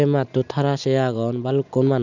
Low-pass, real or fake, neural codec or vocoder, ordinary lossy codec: 7.2 kHz; real; none; none